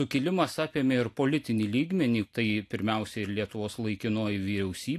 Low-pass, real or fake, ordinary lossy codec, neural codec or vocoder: 14.4 kHz; real; AAC, 64 kbps; none